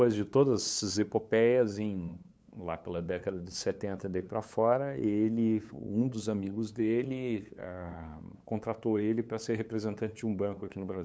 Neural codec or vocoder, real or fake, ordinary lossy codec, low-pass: codec, 16 kHz, 8 kbps, FunCodec, trained on LibriTTS, 25 frames a second; fake; none; none